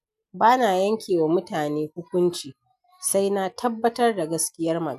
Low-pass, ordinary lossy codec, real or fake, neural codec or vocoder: 14.4 kHz; none; real; none